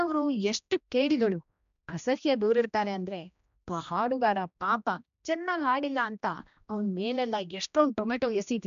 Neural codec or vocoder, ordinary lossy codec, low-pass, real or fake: codec, 16 kHz, 1 kbps, X-Codec, HuBERT features, trained on general audio; none; 7.2 kHz; fake